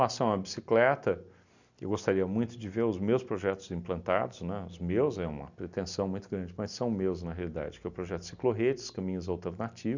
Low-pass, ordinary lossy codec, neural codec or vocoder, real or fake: 7.2 kHz; none; none; real